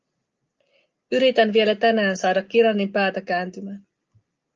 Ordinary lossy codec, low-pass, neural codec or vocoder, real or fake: Opus, 24 kbps; 7.2 kHz; none; real